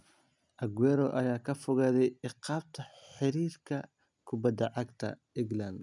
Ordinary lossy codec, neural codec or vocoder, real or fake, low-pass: none; none; real; 10.8 kHz